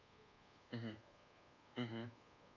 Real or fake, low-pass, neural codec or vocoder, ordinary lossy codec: fake; 7.2 kHz; codec, 24 kHz, 1.2 kbps, DualCodec; none